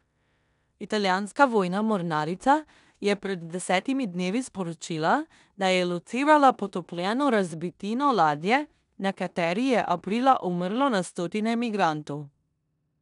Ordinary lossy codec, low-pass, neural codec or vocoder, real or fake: none; 10.8 kHz; codec, 16 kHz in and 24 kHz out, 0.9 kbps, LongCat-Audio-Codec, four codebook decoder; fake